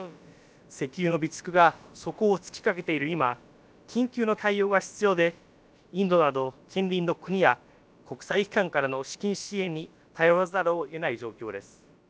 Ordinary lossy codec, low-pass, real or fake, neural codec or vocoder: none; none; fake; codec, 16 kHz, about 1 kbps, DyCAST, with the encoder's durations